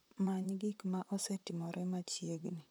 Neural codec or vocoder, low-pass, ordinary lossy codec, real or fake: vocoder, 44.1 kHz, 128 mel bands, Pupu-Vocoder; none; none; fake